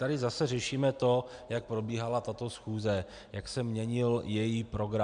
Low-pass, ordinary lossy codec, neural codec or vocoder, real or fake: 9.9 kHz; MP3, 96 kbps; none; real